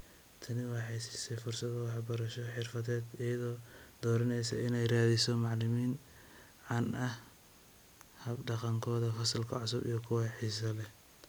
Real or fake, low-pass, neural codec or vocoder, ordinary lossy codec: real; none; none; none